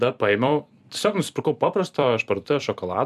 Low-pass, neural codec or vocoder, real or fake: 14.4 kHz; vocoder, 44.1 kHz, 128 mel bands every 256 samples, BigVGAN v2; fake